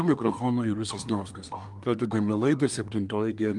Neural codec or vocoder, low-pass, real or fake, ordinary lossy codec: codec, 24 kHz, 1 kbps, SNAC; 10.8 kHz; fake; Opus, 32 kbps